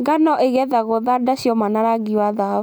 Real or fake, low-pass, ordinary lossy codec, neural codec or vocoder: real; none; none; none